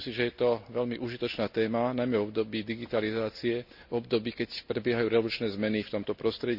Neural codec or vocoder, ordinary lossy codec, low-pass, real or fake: none; none; 5.4 kHz; real